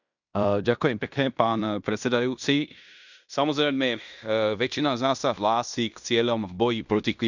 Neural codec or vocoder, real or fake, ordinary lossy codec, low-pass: codec, 16 kHz in and 24 kHz out, 0.9 kbps, LongCat-Audio-Codec, fine tuned four codebook decoder; fake; none; 7.2 kHz